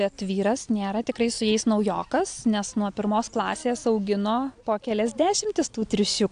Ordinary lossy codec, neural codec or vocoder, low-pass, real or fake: AAC, 64 kbps; none; 9.9 kHz; real